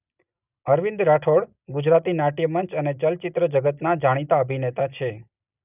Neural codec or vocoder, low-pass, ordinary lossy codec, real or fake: none; 3.6 kHz; none; real